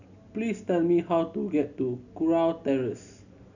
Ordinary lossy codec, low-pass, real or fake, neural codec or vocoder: none; 7.2 kHz; real; none